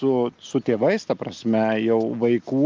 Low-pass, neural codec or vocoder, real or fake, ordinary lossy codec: 7.2 kHz; none; real; Opus, 32 kbps